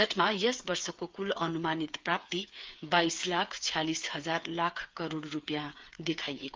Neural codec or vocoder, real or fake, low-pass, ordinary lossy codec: codec, 16 kHz, 8 kbps, FreqCodec, smaller model; fake; 7.2 kHz; Opus, 32 kbps